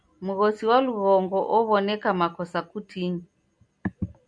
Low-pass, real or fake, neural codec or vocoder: 9.9 kHz; real; none